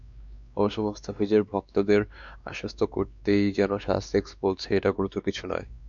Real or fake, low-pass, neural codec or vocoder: fake; 7.2 kHz; codec, 16 kHz, 2 kbps, X-Codec, WavLM features, trained on Multilingual LibriSpeech